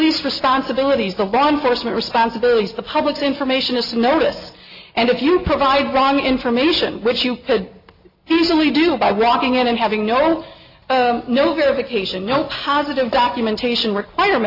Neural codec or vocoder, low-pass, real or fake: none; 5.4 kHz; real